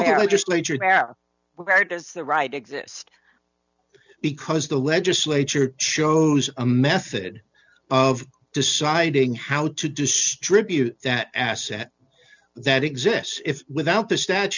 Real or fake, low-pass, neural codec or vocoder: real; 7.2 kHz; none